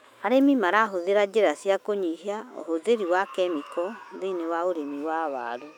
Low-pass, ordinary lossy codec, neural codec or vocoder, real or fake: 19.8 kHz; none; autoencoder, 48 kHz, 128 numbers a frame, DAC-VAE, trained on Japanese speech; fake